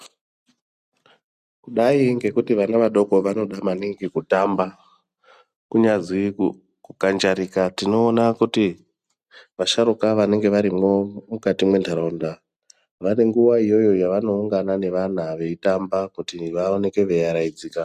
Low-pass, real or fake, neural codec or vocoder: 14.4 kHz; real; none